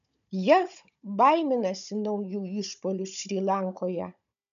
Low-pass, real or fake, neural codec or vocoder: 7.2 kHz; fake; codec, 16 kHz, 16 kbps, FunCodec, trained on Chinese and English, 50 frames a second